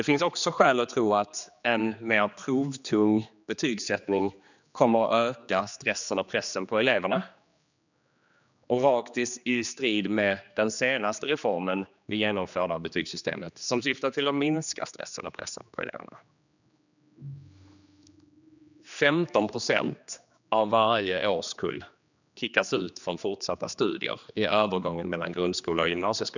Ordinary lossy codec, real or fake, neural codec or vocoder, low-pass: none; fake; codec, 16 kHz, 2 kbps, X-Codec, HuBERT features, trained on general audio; 7.2 kHz